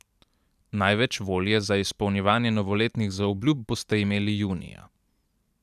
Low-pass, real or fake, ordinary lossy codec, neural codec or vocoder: 14.4 kHz; fake; none; vocoder, 44.1 kHz, 128 mel bands every 512 samples, BigVGAN v2